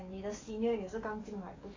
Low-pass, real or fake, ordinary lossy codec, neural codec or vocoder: 7.2 kHz; fake; AAC, 32 kbps; codec, 44.1 kHz, 7.8 kbps, DAC